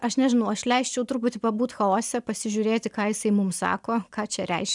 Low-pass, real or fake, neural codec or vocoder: 10.8 kHz; real; none